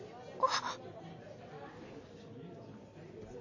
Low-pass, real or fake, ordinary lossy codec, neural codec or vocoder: 7.2 kHz; real; none; none